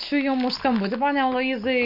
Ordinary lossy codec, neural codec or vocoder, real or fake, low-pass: MP3, 32 kbps; none; real; 5.4 kHz